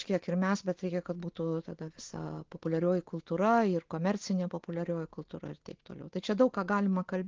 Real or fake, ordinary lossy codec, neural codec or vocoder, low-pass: real; Opus, 16 kbps; none; 7.2 kHz